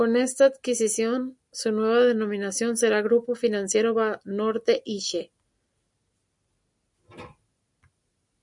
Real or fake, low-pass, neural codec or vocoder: real; 10.8 kHz; none